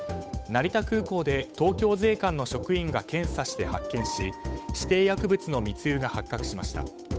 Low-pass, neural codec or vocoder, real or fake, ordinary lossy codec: none; codec, 16 kHz, 8 kbps, FunCodec, trained on Chinese and English, 25 frames a second; fake; none